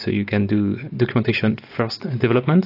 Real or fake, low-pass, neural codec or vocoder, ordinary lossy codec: real; 5.4 kHz; none; AAC, 32 kbps